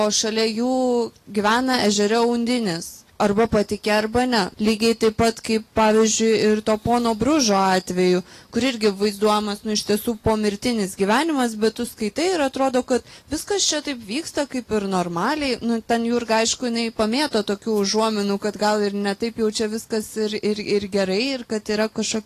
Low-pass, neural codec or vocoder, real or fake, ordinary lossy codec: 14.4 kHz; none; real; AAC, 48 kbps